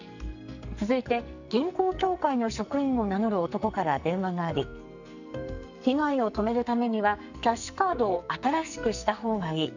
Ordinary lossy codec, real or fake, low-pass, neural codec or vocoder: none; fake; 7.2 kHz; codec, 44.1 kHz, 2.6 kbps, SNAC